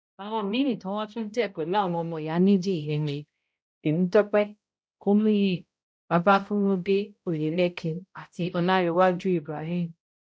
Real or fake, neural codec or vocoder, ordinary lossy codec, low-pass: fake; codec, 16 kHz, 0.5 kbps, X-Codec, HuBERT features, trained on balanced general audio; none; none